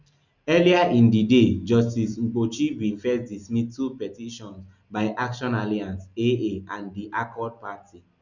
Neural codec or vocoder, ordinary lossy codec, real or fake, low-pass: none; none; real; 7.2 kHz